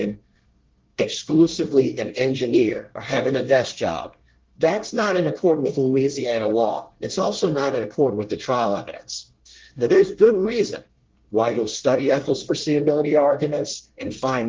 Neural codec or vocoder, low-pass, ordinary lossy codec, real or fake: codec, 24 kHz, 1 kbps, SNAC; 7.2 kHz; Opus, 16 kbps; fake